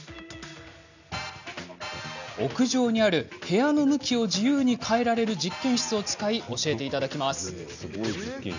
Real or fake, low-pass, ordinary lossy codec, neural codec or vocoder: real; 7.2 kHz; none; none